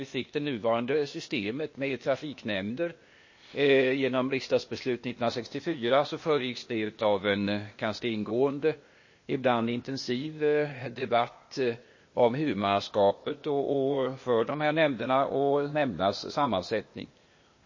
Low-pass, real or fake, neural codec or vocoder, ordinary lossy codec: 7.2 kHz; fake; codec, 16 kHz, 0.8 kbps, ZipCodec; MP3, 32 kbps